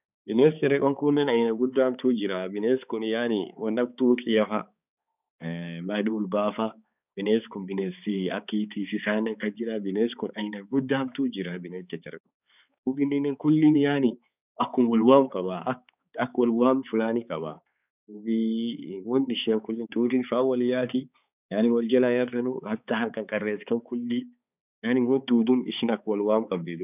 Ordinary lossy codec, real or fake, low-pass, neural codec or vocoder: none; fake; 3.6 kHz; codec, 16 kHz, 4 kbps, X-Codec, HuBERT features, trained on balanced general audio